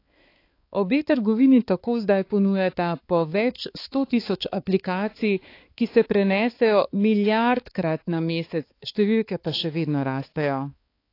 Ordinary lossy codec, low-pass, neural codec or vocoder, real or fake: AAC, 32 kbps; 5.4 kHz; codec, 16 kHz, 4 kbps, X-Codec, HuBERT features, trained on balanced general audio; fake